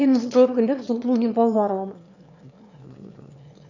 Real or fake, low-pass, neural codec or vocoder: fake; 7.2 kHz; autoencoder, 22.05 kHz, a latent of 192 numbers a frame, VITS, trained on one speaker